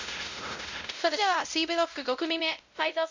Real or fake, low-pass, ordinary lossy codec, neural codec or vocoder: fake; 7.2 kHz; MP3, 64 kbps; codec, 16 kHz, 0.5 kbps, X-Codec, WavLM features, trained on Multilingual LibriSpeech